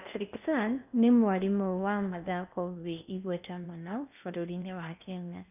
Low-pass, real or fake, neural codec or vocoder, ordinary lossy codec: 3.6 kHz; fake; codec, 16 kHz in and 24 kHz out, 0.8 kbps, FocalCodec, streaming, 65536 codes; none